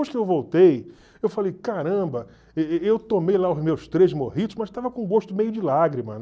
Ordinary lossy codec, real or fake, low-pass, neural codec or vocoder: none; real; none; none